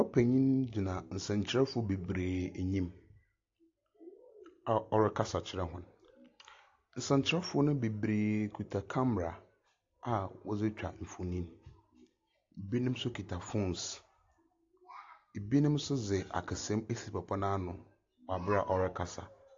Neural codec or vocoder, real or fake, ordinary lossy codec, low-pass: none; real; AAC, 48 kbps; 7.2 kHz